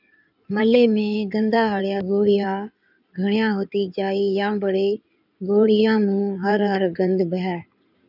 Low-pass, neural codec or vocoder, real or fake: 5.4 kHz; codec, 16 kHz in and 24 kHz out, 2.2 kbps, FireRedTTS-2 codec; fake